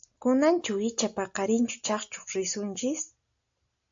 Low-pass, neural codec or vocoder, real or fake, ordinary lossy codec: 7.2 kHz; none; real; AAC, 64 kbps